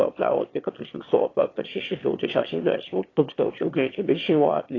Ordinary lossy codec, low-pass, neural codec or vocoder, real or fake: AAC, 32 kbps; 7.2 kHz; autoencoder, 22.05 kHz, a latent of 192 numbers a frame, VITS, trained on one speaker; fake